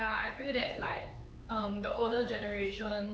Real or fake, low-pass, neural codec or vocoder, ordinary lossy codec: fake; none; codec, 16 kHz, 4 kbps, X-Codec, HuBERT features, trained on LibriSpeech; none